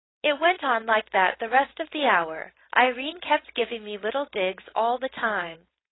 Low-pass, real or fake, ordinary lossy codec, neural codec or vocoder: 7.2 kHz; fake; AAC, 16 kbps; codec, 16 kHz, 4.8 kbps, FACodec